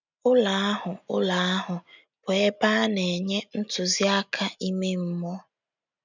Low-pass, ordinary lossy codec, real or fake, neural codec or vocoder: 7.2 kHz; none; real; none